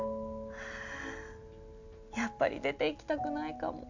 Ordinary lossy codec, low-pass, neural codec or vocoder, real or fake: none; 7.2 kHz; none; real